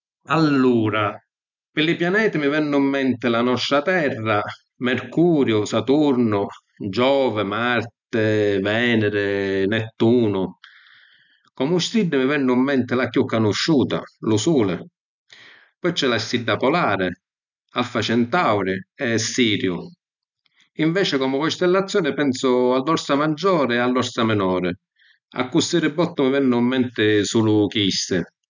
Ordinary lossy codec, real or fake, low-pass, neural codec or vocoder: none; real; 7.2 kHz; none